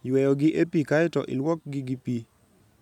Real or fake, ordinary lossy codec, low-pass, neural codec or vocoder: real; none; 19.8 kHz; none